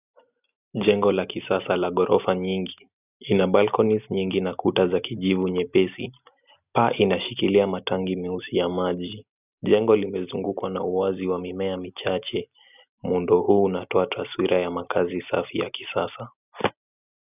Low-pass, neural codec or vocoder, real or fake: 3.6 kHz; none; real